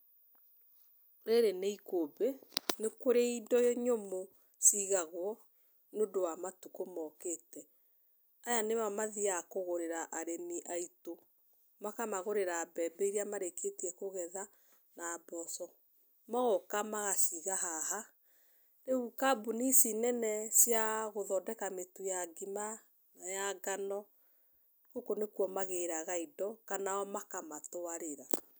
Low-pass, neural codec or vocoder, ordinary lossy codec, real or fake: none; none; none; real